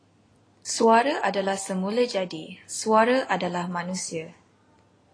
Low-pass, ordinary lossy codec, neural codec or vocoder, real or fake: 9.9 kHz; AAC, 32 kbps; none; real